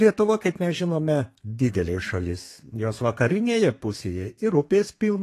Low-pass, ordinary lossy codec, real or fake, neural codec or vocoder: 14.4 kHz; AAC, 48 kbps; fake; codec, 32 kHz, 1.9 kbps, SNAC